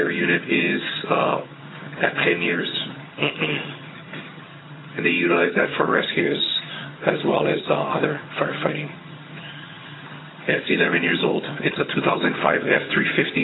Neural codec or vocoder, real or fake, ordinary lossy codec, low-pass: vocoder, 22.05 kHz, 80 mel bands, HiFi-GAN; fake; AAC, 16 kbps; 7.2 kHz